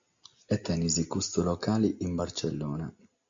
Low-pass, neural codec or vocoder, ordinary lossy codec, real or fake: 7.2 kHz; none; Opus, 64 kbps; real